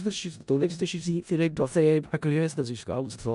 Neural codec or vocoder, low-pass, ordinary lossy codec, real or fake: codec, 16 kHz in and 24 kHz out, 0.4 kbps, LongCat-Audio-Codec, four codebook decoder; 10.8 kHz; none; fake